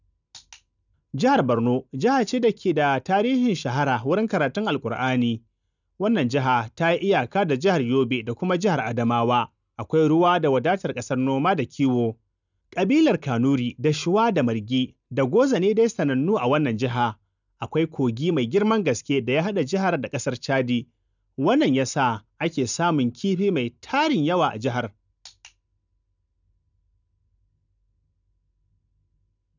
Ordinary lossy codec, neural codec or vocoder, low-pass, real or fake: none; none; 7.2 kHz; real